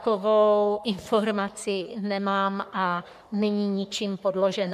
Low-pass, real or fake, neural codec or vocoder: 14.4 kHz; fake; codec, 44.1 kHz, 3.4 kbps, Pupu-Codec